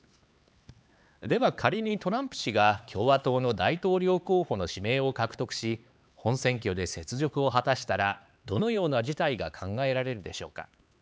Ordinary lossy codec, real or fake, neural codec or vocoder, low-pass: none; fake; codec, 16 kHz, 4 kbps, X-Codec, HuBERT features, trained on LibriSpeech; none